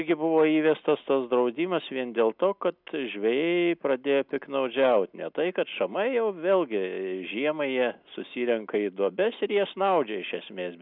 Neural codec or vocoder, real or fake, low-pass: none; real; 5.4 kHz